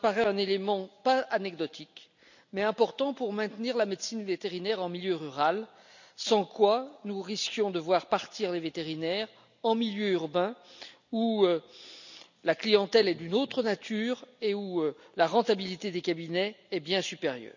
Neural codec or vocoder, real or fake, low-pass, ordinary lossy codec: none; real; 7.2 kHz; none